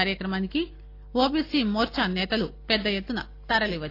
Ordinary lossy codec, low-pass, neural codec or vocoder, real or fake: AAC, 32 kbps; 5.4 kHz; none; real